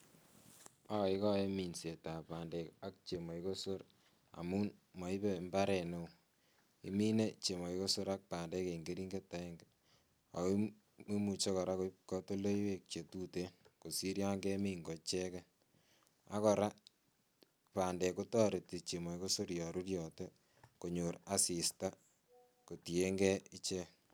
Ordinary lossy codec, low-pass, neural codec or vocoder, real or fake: none; none; none; real